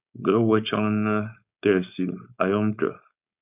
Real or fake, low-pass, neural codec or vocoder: fake; 3.6 kHz; codec, 16 kHz, 4.8 kbps, FACodec